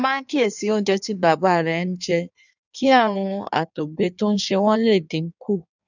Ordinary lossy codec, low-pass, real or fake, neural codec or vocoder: none; 7.2 kHz; fake; codec, 16 kHz in and 24 kHz out, 1.1 kbps, FireRedTTS-2 codec